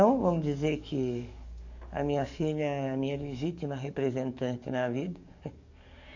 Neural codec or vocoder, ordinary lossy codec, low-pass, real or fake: codec, 44.1 kHz, 7.8 kbps, DAC; none; 7.2 kHz; fake